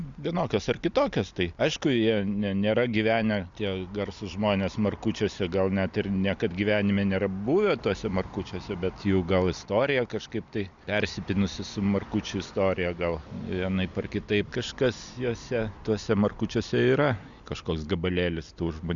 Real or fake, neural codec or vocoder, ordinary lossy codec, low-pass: real; none; Opus, 64 kbps; 7.2 kHz